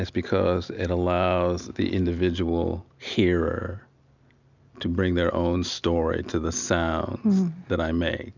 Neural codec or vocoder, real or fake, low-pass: none; real; 7.2 kHz